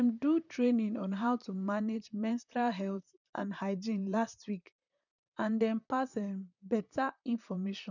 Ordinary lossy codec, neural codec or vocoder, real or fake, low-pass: none; vocoder, 44.1 kHz, 128 mel bands, Pupu-Vocoder; fake; 7.2 kHz